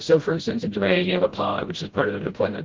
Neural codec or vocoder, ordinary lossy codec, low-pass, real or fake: codec, 16 kHz, 0.5 kbps, FreqCodec, smaller model; Opus, 16 kbps; 7.2 kHz; fake